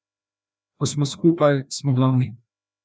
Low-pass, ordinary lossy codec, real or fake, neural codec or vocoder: none; none; fake; codec, 16 kHz, 1 kbps, FreqCodec, larger model